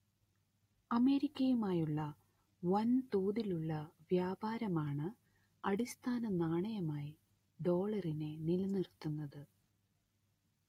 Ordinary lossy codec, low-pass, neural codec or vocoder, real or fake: AAC, 48 kbps; 19.8 kHz; none; real